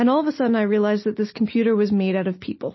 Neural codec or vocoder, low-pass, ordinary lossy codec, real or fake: none; 7.2 kHz; MP3, 24 kbps; real